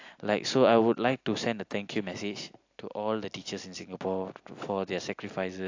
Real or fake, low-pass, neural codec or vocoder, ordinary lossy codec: real; 7.2 kHz; none; AAC, 48 kbps